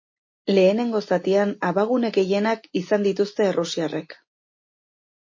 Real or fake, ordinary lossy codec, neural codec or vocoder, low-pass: real; MP3, 32 kbps; none; 7.2 kHz